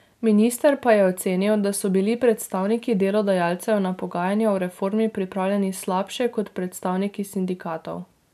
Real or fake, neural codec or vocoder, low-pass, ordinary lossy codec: real; none; 14.4 kHz; none